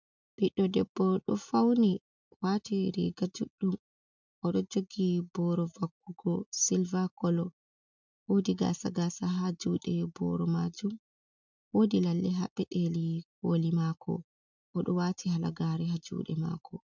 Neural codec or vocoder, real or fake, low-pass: none; real; 7.2 kHz